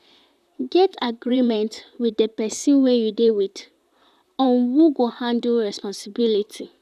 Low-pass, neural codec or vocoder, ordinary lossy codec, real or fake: 14.4 kHz; vocoder, 44.1 kHz, 128 mel bands, Pupu-Vocoder; none; fake